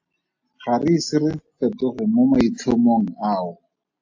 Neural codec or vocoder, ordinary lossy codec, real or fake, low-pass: none; AAC, 48 kbps; real; 7.2 kHz